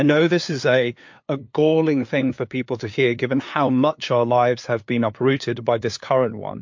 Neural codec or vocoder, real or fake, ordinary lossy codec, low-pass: codec, 16 kHz, 2 kbps, FunCodec, trained on LibriTTS, 25 frames a second; fake; MP3, 48 kbps; 7.2 kHz